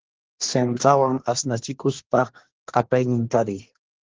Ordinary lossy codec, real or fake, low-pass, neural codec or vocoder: Opus, 16 kbps; fake; 7.2 kHz; codec, 24 kHz, 1 kbps, SNAC